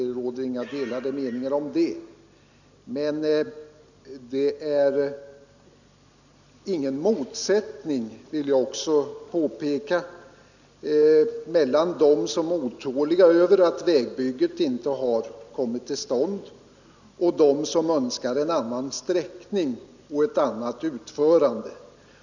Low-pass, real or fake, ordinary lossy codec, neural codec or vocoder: 7.2 kHz; real; none; none